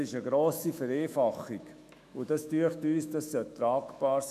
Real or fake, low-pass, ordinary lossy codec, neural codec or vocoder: fake; 14.4 kHz; none; autoencoder, 48 kHz, 128 numbers a frame, DAC-VAE, trained on Japanese speech